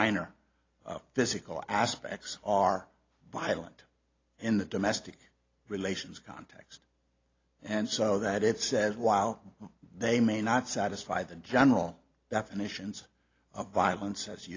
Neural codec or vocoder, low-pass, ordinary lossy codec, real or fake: none; 7.2 kHz; AAC, 32 kbps; real